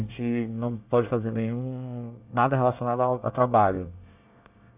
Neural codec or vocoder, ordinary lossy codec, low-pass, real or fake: codec, 24 kHz, 1 kbps, SNAC; AAC, 32 kbps; 3.6 kHz; fake